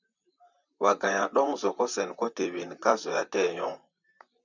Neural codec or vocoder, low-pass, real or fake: vocoder, 44.1 kHz, 128 mel bands, Pupu-Vocoder; 7.2 kHz; fake